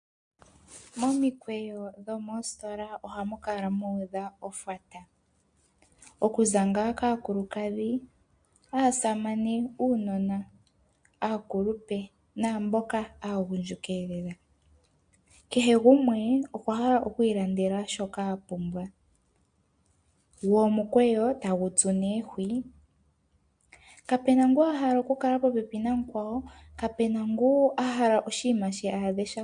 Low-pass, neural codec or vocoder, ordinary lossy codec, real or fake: 9.9 kHz; none; MP3, 64 kbps; real